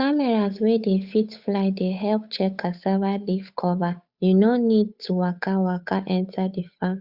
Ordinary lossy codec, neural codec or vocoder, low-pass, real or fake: Opus, 64 kbps; codec, 16 kHz, 8 kbps, FunCodec, trained on Chinese and English, 25 frames a second; 5.4 kHz; fake